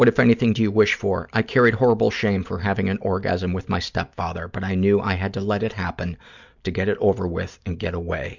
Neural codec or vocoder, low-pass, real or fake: none; 7.2 kHz; real